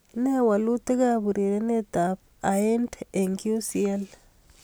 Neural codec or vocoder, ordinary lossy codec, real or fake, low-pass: none; none; real; none